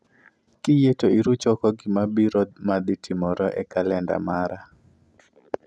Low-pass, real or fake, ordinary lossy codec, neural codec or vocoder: none; real; none; none